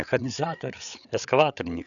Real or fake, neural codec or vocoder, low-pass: fake; codec, 16 kHz, 8 kbps, FreqCodec, larger model; 7.2 kHz